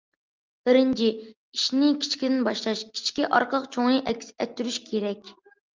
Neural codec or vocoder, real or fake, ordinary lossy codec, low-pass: none; real; Opus, 24 kbps; 7.2 kHz